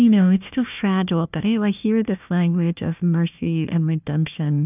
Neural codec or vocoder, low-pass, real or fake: codec, 16 kHz, 1 kbps, FunCodec, trained on Chinese and English, 50 frames a second; 3.6 kHz; fake